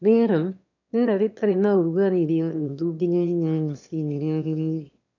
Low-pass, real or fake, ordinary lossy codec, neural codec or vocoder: 7.2 kHz; fake; none; autoencoder, 22.05 kHz, a latent of 192 numbers a frame, VITS, trained on one speaker